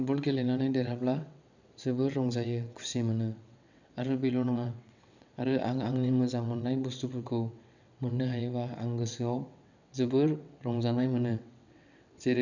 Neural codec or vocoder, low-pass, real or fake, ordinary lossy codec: vocoder, 22.05 kHz, 80 mel bands, WaveNeXt; 7.2 kHz; fake; none